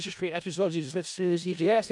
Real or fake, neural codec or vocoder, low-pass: fake; codec, 16 kHz in and 24 kHz out, 0.4 kbps, LongCat-Audio-Codec, four codebook decoder; 10.8 kHz